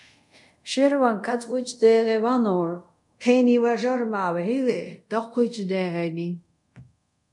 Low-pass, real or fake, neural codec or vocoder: 10.8 kHz; fake; codec, 24 kHz, 0.5 kbps, DualCodec